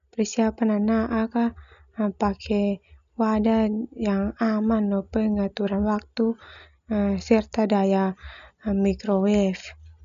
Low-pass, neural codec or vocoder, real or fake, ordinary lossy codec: 7.2 kHz; none; real; none